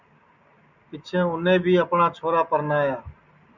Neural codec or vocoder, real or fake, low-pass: none; real; 7.2 kHz